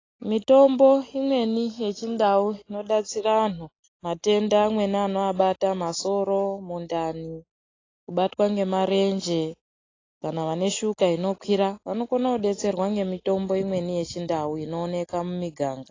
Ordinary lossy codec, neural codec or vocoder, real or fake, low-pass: AAC, 32 kbps; none; real; 7.2 kHz